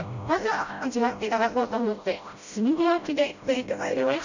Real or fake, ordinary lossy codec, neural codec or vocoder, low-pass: fake; none; codec, 16 kHz, 0.5 kbps, FreqCodec, smaller model; 7.2 kHz